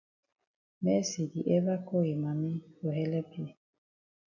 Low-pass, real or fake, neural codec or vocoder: 7.2 kHz; real; none